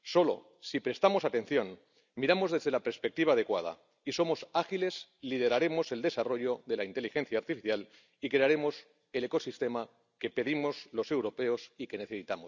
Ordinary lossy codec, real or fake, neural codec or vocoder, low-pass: none; real; none; 7.2 kHz